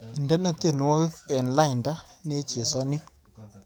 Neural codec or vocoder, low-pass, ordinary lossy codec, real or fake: codec, 44.1 kHz, 7.8 kbps, DAC; none; none; fake